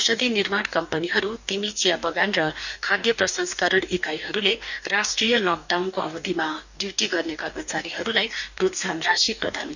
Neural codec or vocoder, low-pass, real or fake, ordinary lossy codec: codec, 44.1 kHz, 2.6 kbps, DAC; 7.2 kHz; fake; none